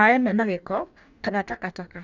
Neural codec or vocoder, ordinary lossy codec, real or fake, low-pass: codec, 44.1 kHz, 2.6 kbps, DAC; none; fake; 7.2 kHz